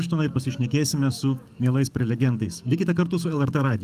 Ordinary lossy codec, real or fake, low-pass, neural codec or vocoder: Opus, 32 kbps; fake; 14.4 kHz; codec, 44.1 kHz, 7.8 kbps, DAC